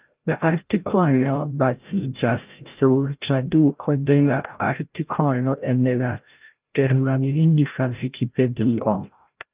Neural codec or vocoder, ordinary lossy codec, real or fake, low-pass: codec, 16 kHz, 0.5 kbps, FreqCodec, larger model; Opus, 32 kbps; fake; 3.6 kHz